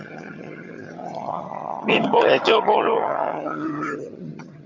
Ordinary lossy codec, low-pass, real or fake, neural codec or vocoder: MP3, 48 kbps; 7.2 kHz; fake; vocoder, 22.05 kHz, 80 mel bands, HiFi-GAN